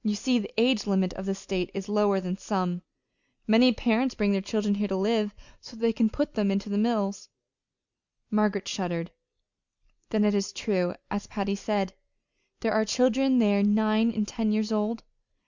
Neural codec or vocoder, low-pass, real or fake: none; 7.2 kHz; real